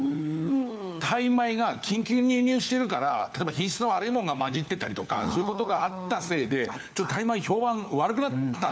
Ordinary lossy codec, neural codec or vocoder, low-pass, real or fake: none; codec, 16 kHz, 4 kbps, FunCodec, trained on LibriTTS, 50 frames a second; none; fake